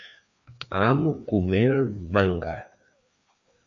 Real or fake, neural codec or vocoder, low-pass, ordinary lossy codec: fake; codec, 16 kHz, 2 kbps, FreqCodec, larger model; 7.2 kHz; AAC, 64 kbps